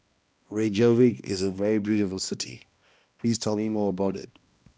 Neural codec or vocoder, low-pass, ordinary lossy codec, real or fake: codec, 16 kHz, 1 kbps, X-Codec, HuBERT features, trained on balanced general audio; none; none; fake